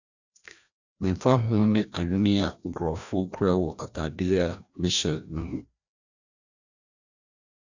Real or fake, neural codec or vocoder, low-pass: fake; codec, 16 kHz, 1 kbps, FreqCodec, larger model; 7.2 kHz